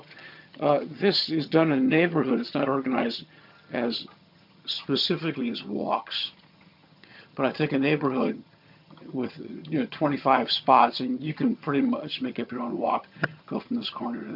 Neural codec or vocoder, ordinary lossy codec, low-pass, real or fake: vocoder, 22.05 kHz, 80 mel bands, HiFi-GAN; MP3, 48 kbps; 5.4 kHz; fake